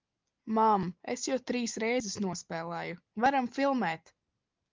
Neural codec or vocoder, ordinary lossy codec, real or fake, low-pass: none; Opus, 32 kbps; real; 7.2 kHz